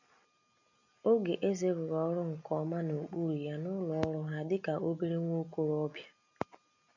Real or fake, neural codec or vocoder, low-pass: real; none; 7.2 kHz